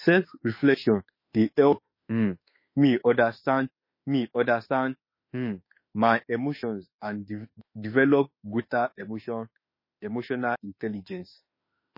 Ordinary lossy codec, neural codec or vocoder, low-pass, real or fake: MP3, 24 kbps; autoencoder, 48 kHz, 32 numbers a frame, DAC-VAE, trained on Japanese speech; 5.4 kHz; fake